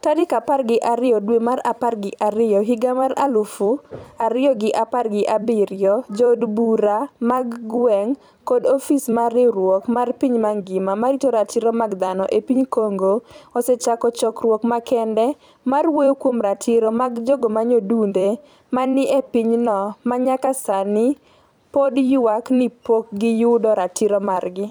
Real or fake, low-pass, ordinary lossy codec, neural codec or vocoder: fake; 19.8 kHz; none; vocoder, 44.1 kHz, 128 mel bands every 256 samples, BigVGAN v2